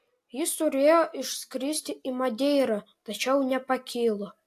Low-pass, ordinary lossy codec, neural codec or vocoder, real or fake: 14.4 kHz; AAC, 64 kbps; none; real